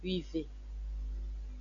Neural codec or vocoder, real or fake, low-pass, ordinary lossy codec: none; real; 7.2 kHz; AAC, 64 kbps